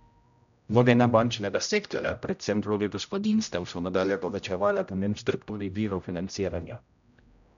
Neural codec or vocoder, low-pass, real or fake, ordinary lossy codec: codec, 16 kHz, 0.5 kbps, X-Codec, HuBERT features, trained on general audio; 7.2 kHz; fake; none